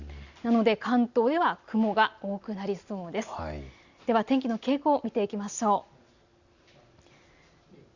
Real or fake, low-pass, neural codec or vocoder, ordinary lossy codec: real; 7.2 kHz; none; Opus, 64 kbps